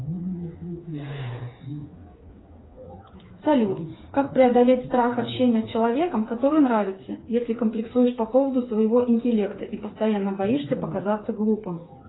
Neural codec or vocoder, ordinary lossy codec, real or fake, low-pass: codec, 16 kHz, 4 kbps, FreqCodec, smaller model; AAC, 16 kbps; fake; 7.2 kHz